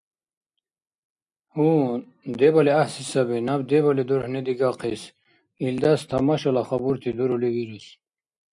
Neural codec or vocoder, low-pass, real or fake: none; 10.8 kHz; real